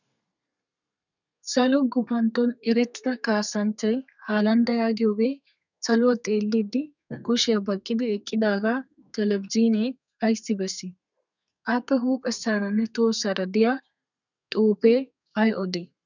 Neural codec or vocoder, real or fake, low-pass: codec, 32 kHz, 1.9 kbps, SNAC; fake; 7.2 kHz